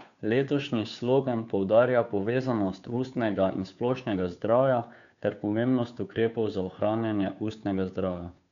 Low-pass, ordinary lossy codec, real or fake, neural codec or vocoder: 7.2 kHz; none; fake; codec, 16 kHz, 2 kbps, FunCodec, trained on Chinese and English, 25 frames a second